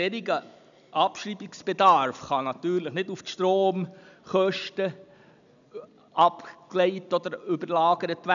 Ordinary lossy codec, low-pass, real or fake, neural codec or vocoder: none; 7.2 kHz; real; none